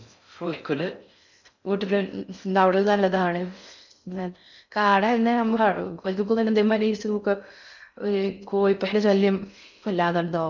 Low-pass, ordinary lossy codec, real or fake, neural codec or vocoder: 7.2 kHz; none; fake; codec, 16 kHz in and 24 kHz out, 0.6 kbps, FocalCodec, streaming, 4096 codes